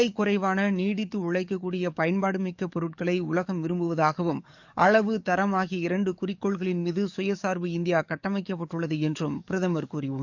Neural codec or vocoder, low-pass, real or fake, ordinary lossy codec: codec, 44.1 kHz, 7.8 kbps, DAC; 7.2 kHz; fake; none